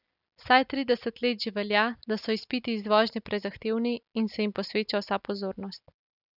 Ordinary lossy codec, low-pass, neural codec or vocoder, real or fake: none; 5.4 kHz; none; real